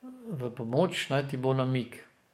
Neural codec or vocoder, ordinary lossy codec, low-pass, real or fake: codec, 44.1 kHz, 7.8 kbps, DAC; MP3, 64 kbps; 19.8 kHz; fake